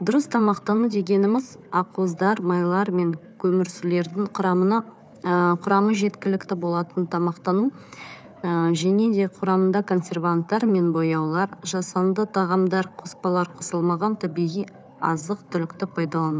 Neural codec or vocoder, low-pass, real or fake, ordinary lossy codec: codec, 16 kHz, 4 kbps, FunCodec, trained on Chinese and English, 50 frames a second; none; fake; none